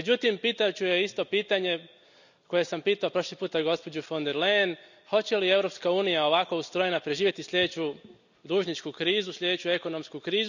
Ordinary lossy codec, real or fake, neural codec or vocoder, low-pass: none; real; none; 7.2 kHz